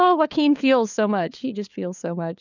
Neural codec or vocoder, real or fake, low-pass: codec, 16 kHz, 4 kbps, FunCodec, trained on LibriTTS, 50 frames a second; fake; 7.2 kHz